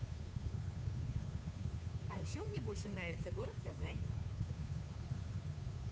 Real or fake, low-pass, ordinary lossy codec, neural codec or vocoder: fake; none; none; codec, 16 kHz, 2 kbps, FunCodec, trained on Chinese and English, 25 frames a second